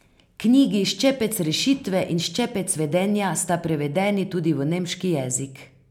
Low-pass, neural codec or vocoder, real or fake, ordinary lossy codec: 19.8 kHz; none; real; none